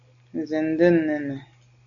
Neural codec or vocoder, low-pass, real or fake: none; 7.2 kHz; real